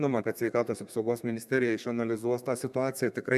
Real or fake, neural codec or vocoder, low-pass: fake; codec, 32 kHz, 1.9 kbps, SNAC; 14.4 kHz